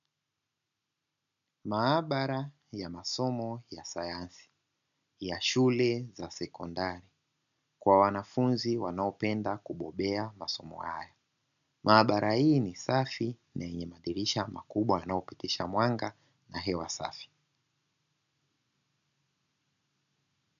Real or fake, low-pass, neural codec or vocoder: real; 7.2 kHz; none